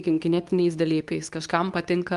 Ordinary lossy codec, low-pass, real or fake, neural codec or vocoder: Opus, 32 kbps; 10.8 kHz; fake; codec, 24 kHz, 0.9 kbps, WavTokenizer, medium speech release version 2